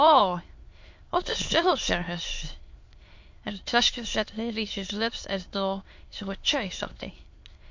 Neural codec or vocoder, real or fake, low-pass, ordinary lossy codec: autoencoder, 22.05 kHz, a latent of 192 numbers a frame, VITS, trained on many speakers; fake; 7.2 kHz; MP3, 48 kbps